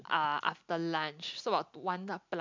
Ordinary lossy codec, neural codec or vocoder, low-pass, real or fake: MP3, 64 kbps; none; 7.2 kHz; real